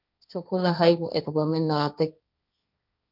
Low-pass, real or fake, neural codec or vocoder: 5.4 kHz; fake; codec, 16 kHz, 1.1 kbps, Voila-Tokenizer